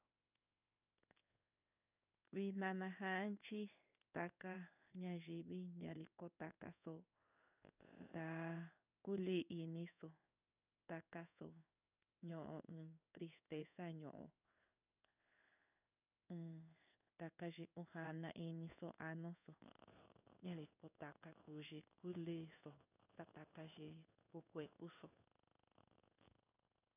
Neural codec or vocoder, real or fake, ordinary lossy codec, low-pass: codec, 16 kHz in and 24 kHz out, 1 kbps, XY-Tokenizer; fake; none; 3.6 kHz